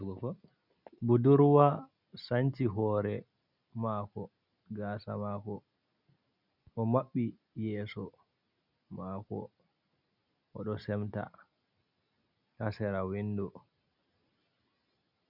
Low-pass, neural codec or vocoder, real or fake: 5.4 kHz; none; real